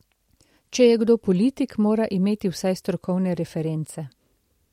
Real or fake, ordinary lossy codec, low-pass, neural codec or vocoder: real; MP3, 64 kbps; 19.8 kHz; none